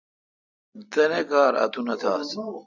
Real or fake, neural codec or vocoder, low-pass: real; none; 7.2 kHz